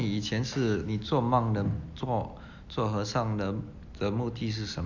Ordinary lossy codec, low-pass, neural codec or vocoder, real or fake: Opus, 64 kbps; 7.2 kHz; none; real